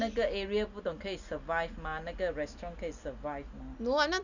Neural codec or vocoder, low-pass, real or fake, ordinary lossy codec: none; 7.2 kHz; real; none